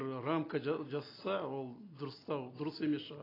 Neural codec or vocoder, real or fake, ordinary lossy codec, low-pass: none; real; AAC, 24 kbps; 5.4 kHz